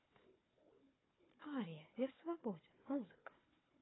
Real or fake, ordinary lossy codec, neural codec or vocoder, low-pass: fake; AAC, 16 kbps; codec, 16 kHz, 4 kbps, FunCodec, trained on Chinese and English, 50 frames a second; 7.2 kHz